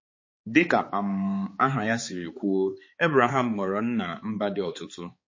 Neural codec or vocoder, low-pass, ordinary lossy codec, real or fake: codec, 16 kHz, 4 kbps, X-Codec, HuBERT features, trained on balanced general audio; 7.2 kHz; MP3, 32 kbps; fake